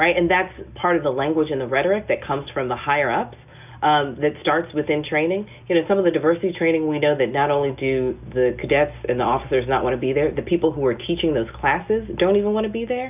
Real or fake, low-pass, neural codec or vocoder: real; 3.6 kHz; none